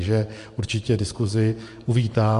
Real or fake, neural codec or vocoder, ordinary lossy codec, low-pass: fake; vocoder, 24 kHz, 100 mel bands, Vocos; MP3, 64 kbps; 10.8 kHz